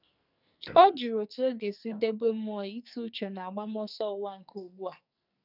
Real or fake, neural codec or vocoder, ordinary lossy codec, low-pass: fake; codec, 44.1 kHz, 2.6 kbps, SNAC; none; 5.4 kHz